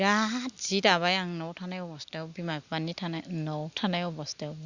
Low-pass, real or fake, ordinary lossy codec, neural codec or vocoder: 7.2 kHz; real; Opus, 64 kbps; none